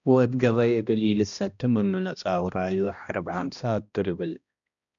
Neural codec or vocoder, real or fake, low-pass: codec, 16 kHz, 1 kbps, X-Codec, HuBERT features, trained on balanced general audio; fake; 7.2 kHz